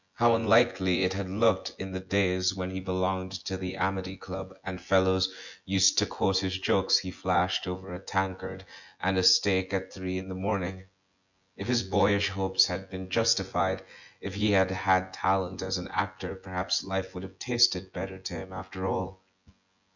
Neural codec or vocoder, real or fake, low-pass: vocoder, 24 kHz, 100 mel bands, Vocos; fake; 7.2 kHz